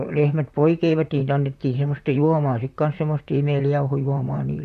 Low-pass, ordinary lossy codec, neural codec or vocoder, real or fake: 14.4 kHz; none; vocoder, 44.1 kHz, 128 mel bands, Pupu-Vocoder; fake